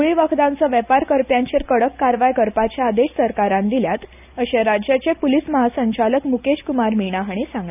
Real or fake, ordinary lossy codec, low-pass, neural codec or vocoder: real; none; 3.6 kHz; none